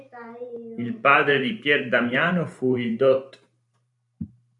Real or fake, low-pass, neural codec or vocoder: fake; 10.8 kHz; vocoder, 44.1 kHz, 128 mel bands every 512 samples, BigVGAN v2